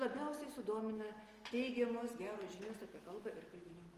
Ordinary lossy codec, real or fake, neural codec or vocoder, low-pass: Opus, 24 kbps; real; none; 14.4 kHz